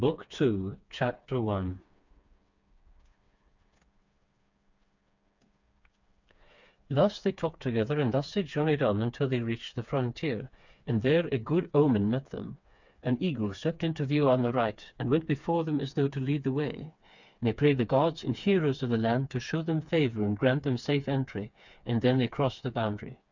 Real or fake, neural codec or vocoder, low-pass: fake; codec, 16 kHz, 4 kbps, FreqCodec, smaller model; 7.2 kHz